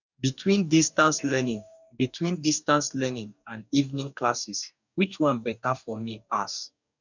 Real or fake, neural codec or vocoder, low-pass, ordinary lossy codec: fake; codec, 44.1 kHz, 2.6 kbps, DAC; 7.2 kHz; none